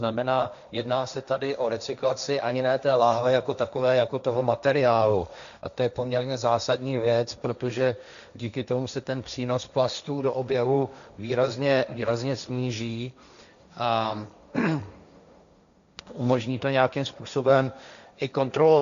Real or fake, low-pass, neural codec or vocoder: fake; 7.2 kHz; codec, 16 kHz, 1.1 kbps, Voila-Tokenizer